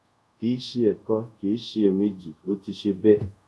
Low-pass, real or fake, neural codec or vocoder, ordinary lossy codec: none; fake; codec, 24 kHz, 0.5 kbps, DualCodec; none